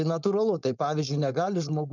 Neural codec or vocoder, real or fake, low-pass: codec, 16 kHz, 4.8 kbps, FACodec; fake; 7.2 kHz